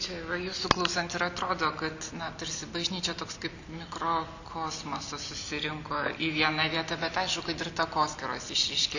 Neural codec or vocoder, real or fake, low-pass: none; real; 7.2 kHz